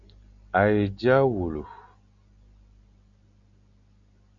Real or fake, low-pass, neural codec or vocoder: real; 7.2 kHz; none